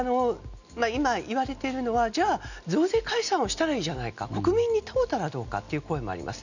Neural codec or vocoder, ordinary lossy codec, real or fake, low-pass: none; none; real; 7.2 kHz